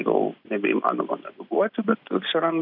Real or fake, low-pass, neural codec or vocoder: real; 5.4 kHz; none